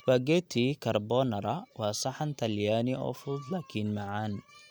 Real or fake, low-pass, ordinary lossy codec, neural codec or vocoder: real; none; none; none